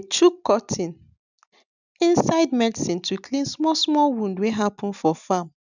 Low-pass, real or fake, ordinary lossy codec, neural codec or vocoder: 7.2 kHz; real; none; none